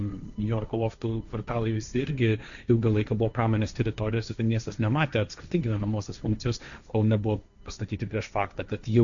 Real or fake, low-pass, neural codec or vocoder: fake; 7.2 kHz; codec, 16 kHz, 1.1 kbps, Voila-Tokenizer